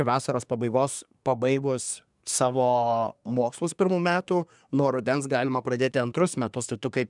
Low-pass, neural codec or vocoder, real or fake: 10.8 kHz; codec, 24 kHz, 1 kbps, SNAC; fake